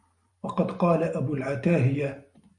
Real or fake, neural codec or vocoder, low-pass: fake; vocoder, 44.1 kHz, 128 mel bands every 512 samples, BigVGAN v2; 10.8 kHz